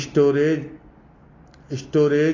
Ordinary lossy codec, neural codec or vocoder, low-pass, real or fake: AAC, 32 kbps; none; 7.2 kHz; real